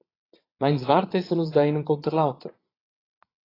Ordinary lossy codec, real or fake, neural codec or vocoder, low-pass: AAC, 24 kbps; fake; vocoder, 22.05 kHz, 80 mel bands, Vocos; 5.4 kHz